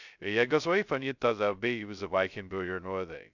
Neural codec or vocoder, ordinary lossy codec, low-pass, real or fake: codec, 16 kHz, 0.2 kbps, FocalCodec; none; 7.2 kHz; fake